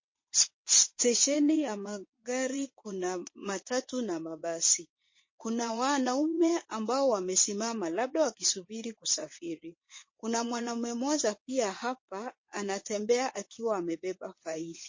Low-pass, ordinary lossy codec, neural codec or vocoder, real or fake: 7.2 kHz; MP3, 32 kbps; vocoder, 22.05 kHz, 80 mel bands, WaveNeXt; fake